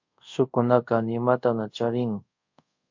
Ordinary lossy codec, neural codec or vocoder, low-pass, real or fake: MP3, 48 kbps; codec, 24 kHz, 0.5 kbps, DualCodec; 7.2 kHz; fake